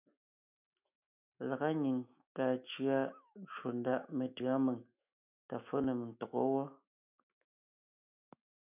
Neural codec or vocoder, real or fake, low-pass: autoencoder, 48 kHz, 128 numbers a frame, DAC-VAE, trained on Japanese speech; fake; 3.6 kHz